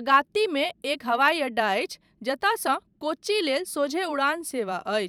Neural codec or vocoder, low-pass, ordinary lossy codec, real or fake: vocoder, 44.1 kHz, 128 mel bands every 512 samples, BigVGAN v2; 19.8 kHz; none; fake